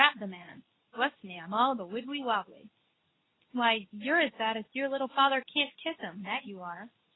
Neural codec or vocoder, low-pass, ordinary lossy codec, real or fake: codec, 24 kHz, 0.9 kbps, WavTokenizer, medium speech release version 2; 7.2 kHz; AAC, 16 kbps; fake